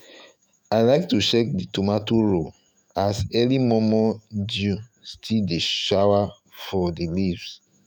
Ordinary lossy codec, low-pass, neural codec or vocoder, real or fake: none; none; autoencoder, 48 kHz, 128 numbers a frame, DAC-VAE, trained on Japanese speech; fake